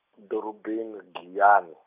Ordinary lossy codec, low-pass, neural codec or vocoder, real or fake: none; 3.6 kHz; none; real